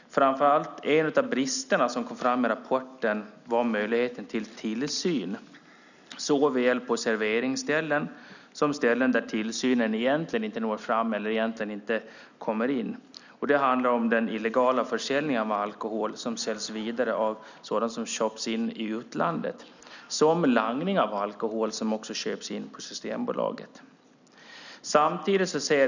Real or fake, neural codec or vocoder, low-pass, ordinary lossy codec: real; none; 7.2 kHz; none